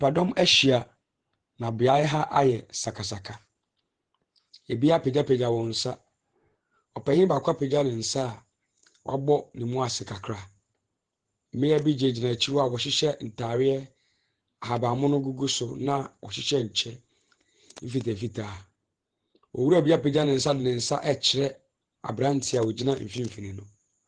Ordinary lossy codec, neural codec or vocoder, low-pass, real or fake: Opus, 16 kbps; vocoder, 48 kHz, 128 mel bands, Vocos; 9.9 kHz; fake